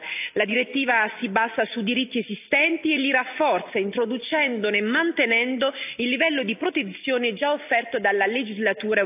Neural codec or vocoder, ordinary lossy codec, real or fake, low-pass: none; AAC, 32 kbps; real; 3.6 kHz